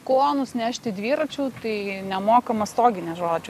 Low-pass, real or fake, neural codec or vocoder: 14.4 kHz; real; none